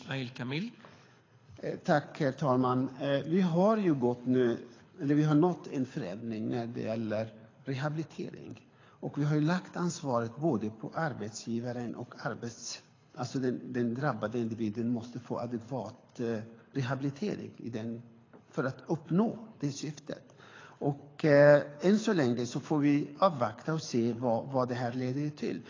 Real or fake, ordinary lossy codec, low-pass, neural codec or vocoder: fake; AAC, 32 kbps; 7.2 kHz; codec, 24 kHz, 6 kbps, HILCodec